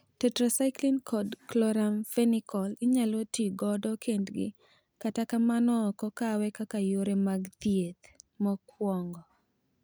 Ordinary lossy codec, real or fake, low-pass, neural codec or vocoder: none; real; none; none